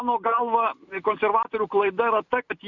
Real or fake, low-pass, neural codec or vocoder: real; 7.2 kHz; none